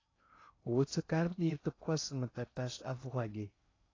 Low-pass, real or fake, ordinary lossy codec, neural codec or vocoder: 7.2 kHz; fake; AAC, 32 kbps; codec, 16 kHz in and 24 kHz out, 0.6 kbps, FocalCodec, streaming, 2048 codes